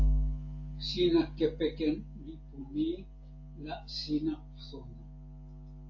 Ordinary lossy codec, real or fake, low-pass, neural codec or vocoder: AAC, 48 kbps; real; 7.2 kHz; none